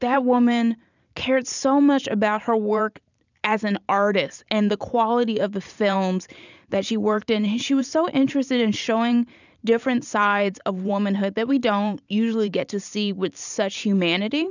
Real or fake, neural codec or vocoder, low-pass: fake; vocoder, 44.1 kHz, 128 mel bands every 512 samples, BigVGAN v2; 7.2 kHz